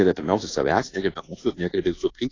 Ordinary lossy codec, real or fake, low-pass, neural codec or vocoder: AAC, 32 kbps; fake; 7.2 kHz; codec, 24 kHz, 1.2 kbps, DualCodec